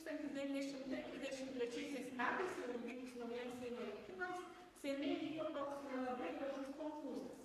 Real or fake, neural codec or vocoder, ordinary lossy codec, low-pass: fake; codec, 44.1 kHz, 3.4 kbps, Pupu-Codec; AAC, 64 kbps; 14.4 kHz